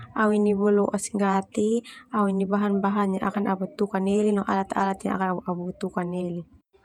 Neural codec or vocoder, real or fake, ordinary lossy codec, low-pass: vocoder, 48 kHz, 128 mel bands, Vocos; fake; none; 19.8 kHz